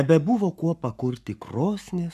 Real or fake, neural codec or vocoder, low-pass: fake; codec, 44.1 kHz, 7.8 kbps, Pupu-Codec; 14.4 kHz